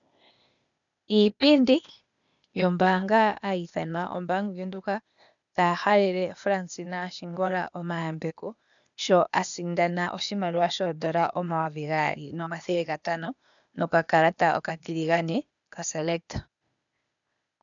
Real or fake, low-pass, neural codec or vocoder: fake; 7.2 kHz; codec, 16 kHz, 0.8 kbps, ZipCodec